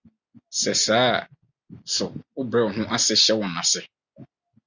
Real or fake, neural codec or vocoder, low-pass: real; none; 7.2 kHz